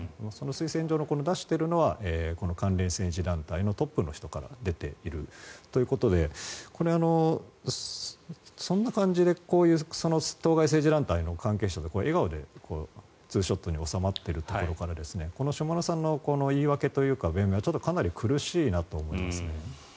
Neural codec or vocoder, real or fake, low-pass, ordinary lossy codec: none; real; none; none